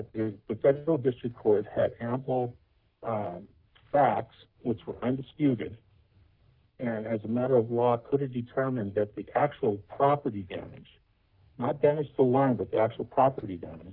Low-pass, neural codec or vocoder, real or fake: 5.4 kHz; codec, 44.1 kHz, 3.4 kbps, Pupu-Codec; fake